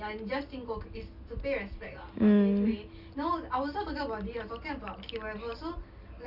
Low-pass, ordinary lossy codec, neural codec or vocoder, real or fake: 5.4 kHz; none; none; real